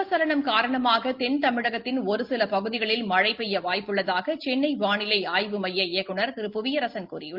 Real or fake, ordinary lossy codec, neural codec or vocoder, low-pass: real; Opus, 32 kbps; none; 5.4 kHz